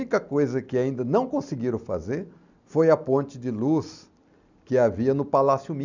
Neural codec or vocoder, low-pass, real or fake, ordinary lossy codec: none; 7.2 kHz; real; none